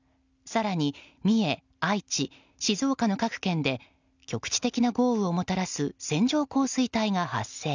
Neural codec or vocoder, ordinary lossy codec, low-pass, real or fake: none; none; 7.2 kHz; real